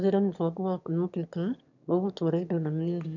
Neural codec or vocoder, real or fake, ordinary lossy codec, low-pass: autoencoder, 22.05 kHz, a latent of 192 numbers a frame, VITS, trained on one speaker; fake; none; 7.2 kHz